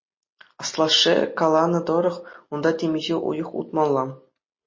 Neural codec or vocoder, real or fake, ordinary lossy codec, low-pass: none; real; MP3, 32 kbps; 7.2 kHz